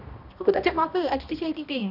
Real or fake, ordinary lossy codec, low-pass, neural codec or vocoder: fake; none; 5.4 kHz; codec, 16 kHz, 1 kbps, X-Codec, HuBERT features, trained on balanced general audio